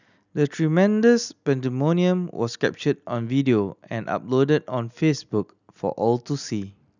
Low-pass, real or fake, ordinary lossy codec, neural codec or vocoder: 7.2 kHz; real; none; none